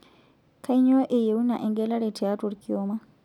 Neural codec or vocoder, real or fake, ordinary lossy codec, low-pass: none; real; none; 19.8 kHz